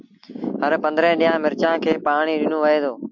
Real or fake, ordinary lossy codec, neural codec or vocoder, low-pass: real; MP3, 64 kbps; none; 7.2 kHz